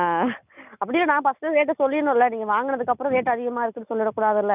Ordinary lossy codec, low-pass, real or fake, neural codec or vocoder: none; 3.6 kHz; real; none